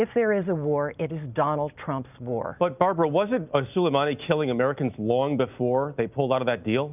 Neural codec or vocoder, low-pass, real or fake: none; 3.6 kHz; real